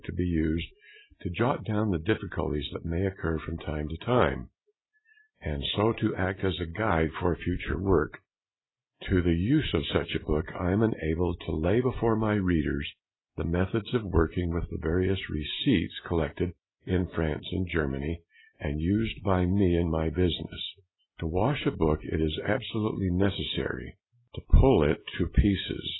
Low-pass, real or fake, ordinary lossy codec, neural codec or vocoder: 7.2 kHz; real; AAC, 16 kbps; none